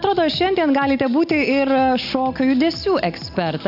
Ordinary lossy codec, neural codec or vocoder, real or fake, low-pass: AAC, 48 kbps; none; real; 5.4 kHz